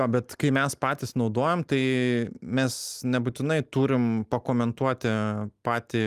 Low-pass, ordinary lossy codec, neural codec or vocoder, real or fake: 14.4 kHz; Opus, 32 kbps; none; real